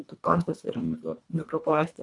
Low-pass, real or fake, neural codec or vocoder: 10.8 kHz; fake; codec, 24 kHz, 1.5 kbps, HILCodec